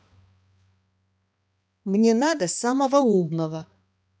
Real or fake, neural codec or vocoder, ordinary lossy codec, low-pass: fake; codec, 16 kHz, 2 kbps, X-Codec, HuBERT features, trained on balanced general audio; none; none